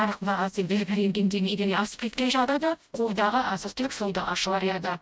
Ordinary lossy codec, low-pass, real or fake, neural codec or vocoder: none; none; fake; codec, 16 kHz, 0.5 kbps, FreqCodec, smaller model